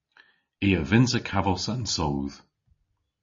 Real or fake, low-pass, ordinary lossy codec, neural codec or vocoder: real; 7.2 kHz; MP3, 32 kbps; none